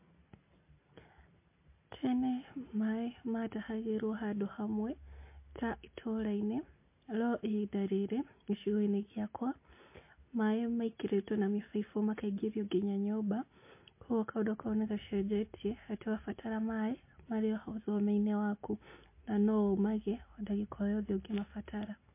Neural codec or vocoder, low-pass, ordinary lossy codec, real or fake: none; 3.6 kHz; MP3, 24 kbps; real